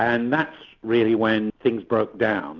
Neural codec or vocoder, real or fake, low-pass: none; real; 7.2 kHz